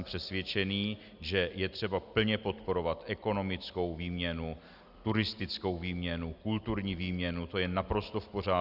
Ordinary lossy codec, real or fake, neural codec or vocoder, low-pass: Opus, 64 kbps; real; none; 5.4 kHz